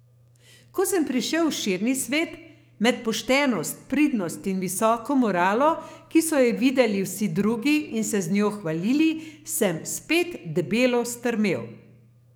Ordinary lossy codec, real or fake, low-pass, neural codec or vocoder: none; fake; none; codec, 44.1 kHz, 7.8 kbps, DAC